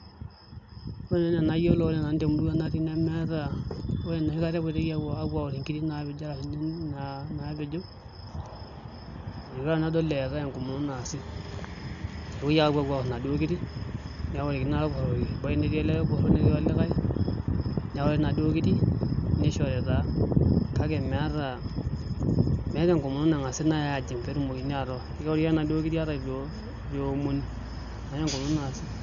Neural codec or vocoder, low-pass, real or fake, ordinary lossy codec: none; 7.2 kHz; real; none